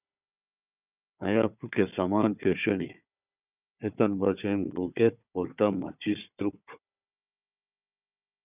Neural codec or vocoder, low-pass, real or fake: codec, 16 kHz, 4 kbps, FunCodec, trained on Chinese and English, 50 frames a second; 3.6 kHz; fake